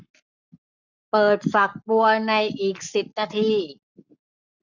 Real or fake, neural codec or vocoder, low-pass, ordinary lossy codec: fake; codec, 44.1 kHz, 7.8 kbps, Pupu-Codec; 7.2 kHz; none